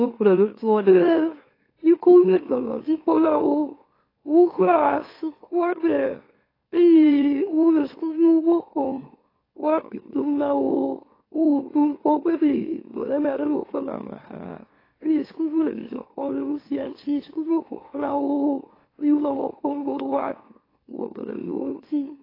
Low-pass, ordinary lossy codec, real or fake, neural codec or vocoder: 5.4 kHz; AAC, 24 kbps; fake; autoencoder, 44.1 kHz, a latent of 192 numbers a frame, MeloTTS